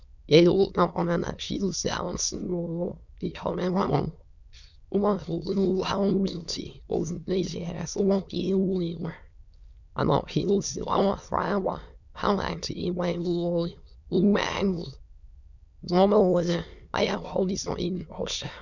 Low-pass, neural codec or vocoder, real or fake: 7.2 kHz; autoencoder, 22.05 kHz, a latent of 192 numbers a frame, VITS, trained on many speakers; fake